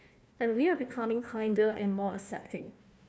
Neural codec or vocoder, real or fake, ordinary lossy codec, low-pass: codec, 16 kHz, 1 kbps, FunCodec, trained on Chinese and English, 50 frames a second; fake; none; none